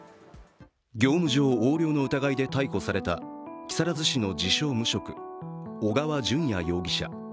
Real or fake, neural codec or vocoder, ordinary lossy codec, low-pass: real; none; none; none